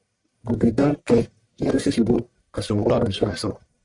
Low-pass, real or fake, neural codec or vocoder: 10.8 kHz; fake; codec, 44.1 kHz, 1.7 kbps, Pupu-Codec